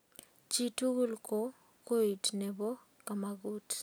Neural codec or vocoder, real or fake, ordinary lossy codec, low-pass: none; real; none; none